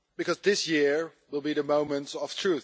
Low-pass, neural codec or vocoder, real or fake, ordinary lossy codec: none; none; real; none